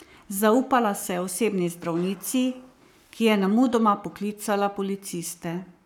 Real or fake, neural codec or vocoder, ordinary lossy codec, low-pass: fake; codec, 44.1 kHz, 7.8 kbps, Pupu-Codec; none; 19.8 kHz